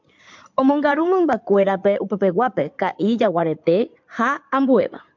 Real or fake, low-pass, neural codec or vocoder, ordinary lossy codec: fake; 7.2 kHz; codec, 16 kHz in and 24 kHz out, 2.2 kbps, FireRedTTS-2 codec; MP3, 64 kbps